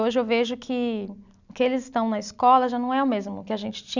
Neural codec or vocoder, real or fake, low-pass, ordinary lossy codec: none; real; 7.2 kHz; none